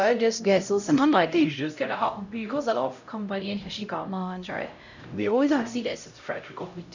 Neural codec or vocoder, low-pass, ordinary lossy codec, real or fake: codec, 16 kHz, 0.5 kbps, X-Codec, HuBERT features, trained on LibriSpeech; 7.2 kHz; none; fake